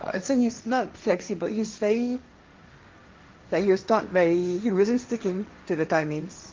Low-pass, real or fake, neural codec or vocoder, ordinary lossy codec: 7.2 kHz; fake; codec, 16 kHz, 1.1 kbps, Voila-Tokenizer; Opus, 32 kbps